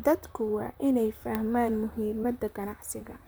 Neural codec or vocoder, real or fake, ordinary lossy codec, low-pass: vocoder, 44.1 kHz, 128 mel bands, Pupu-Vocoder; fake; none; none